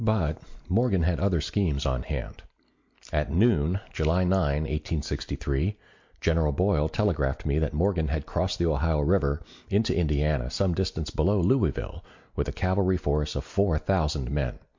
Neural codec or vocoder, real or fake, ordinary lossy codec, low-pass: none; real; MP3, 48 kbps; 7.2 kHz